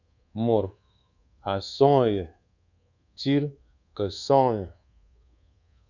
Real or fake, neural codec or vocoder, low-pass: fake; codec, 24 kHz, 1.2 kbps, DualCodec; 7.2 kHz